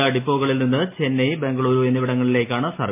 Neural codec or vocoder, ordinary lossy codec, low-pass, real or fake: none; MP3, 24 kbps; 3.6 kHz; real